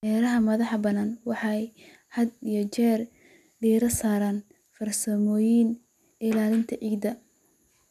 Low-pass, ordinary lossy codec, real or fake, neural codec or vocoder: 14.4 kHz; none; real; none